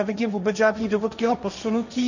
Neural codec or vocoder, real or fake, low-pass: codec, 16 kHz, 1.1 kbps, Voila-Tokenizer; fake; 7.2 kHz